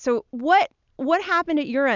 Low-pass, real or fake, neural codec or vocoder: 7.2 kHz; real; none